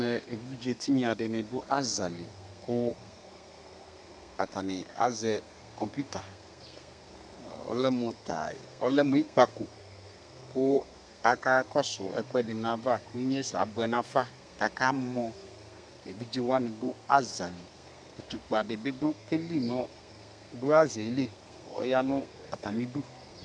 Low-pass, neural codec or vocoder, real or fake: 9.9 kHz; codec, 32 kHz, 1.9 kbps, SNAC; fake